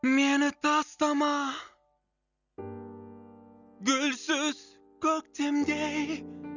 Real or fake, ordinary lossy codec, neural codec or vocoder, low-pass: real; none; none; 7.2 kHz